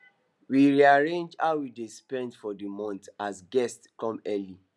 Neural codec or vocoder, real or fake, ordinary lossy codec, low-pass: none; real; none; none